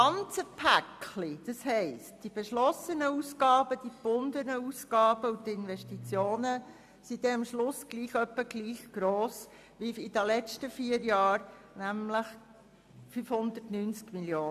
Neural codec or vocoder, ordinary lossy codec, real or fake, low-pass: none; AAC, 96 kbps; real; 14.4 kHz